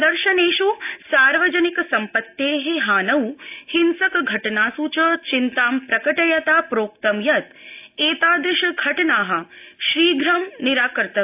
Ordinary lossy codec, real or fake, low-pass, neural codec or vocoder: AAC, 32 kbps; real; 3.6 kHz; none